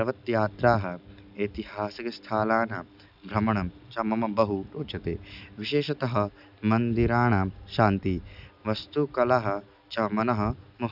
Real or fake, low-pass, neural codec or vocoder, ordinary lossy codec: real; 5.4 kHz; none; none